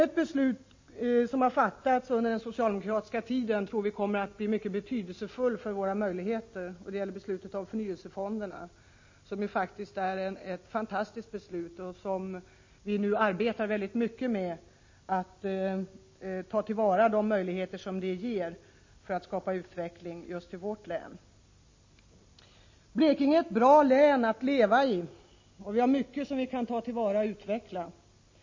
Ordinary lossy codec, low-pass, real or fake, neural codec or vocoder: MP3, 32 kbps; 7.2 kHz; real; none